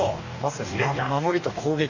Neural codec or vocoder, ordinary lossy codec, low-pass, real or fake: codec, 44.1 kHz, 2.6 kbps, DAC; none; 7.2 kHz; fake